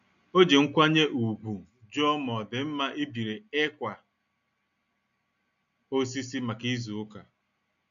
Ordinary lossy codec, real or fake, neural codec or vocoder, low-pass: none; real; none; 7.2 kHz